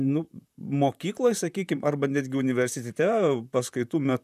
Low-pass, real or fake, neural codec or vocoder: 14.4 kHz; fake; vocoder, 44.1 kHz, 128 mel bands, Pupu-Vocoder